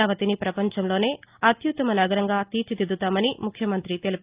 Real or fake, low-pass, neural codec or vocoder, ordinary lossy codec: real; 3.6 kHz; none; Opus, 32 kbps